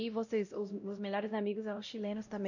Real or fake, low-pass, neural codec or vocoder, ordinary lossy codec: fake; 7.2 kHz; codec, 16 kHz, 0.5 kbps, X-Codec, WavLM features, trained on Multilingual LibriSpeech; none